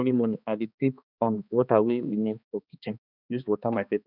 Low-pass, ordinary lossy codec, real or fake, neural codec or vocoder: 5.4 kHz; none; fake; codec, 16 kHz, 2 kbps, X-Codec, HuBERT features, trained on balanced general audio